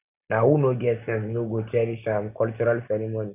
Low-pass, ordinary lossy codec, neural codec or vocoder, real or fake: 3.6 kHz; none; none; real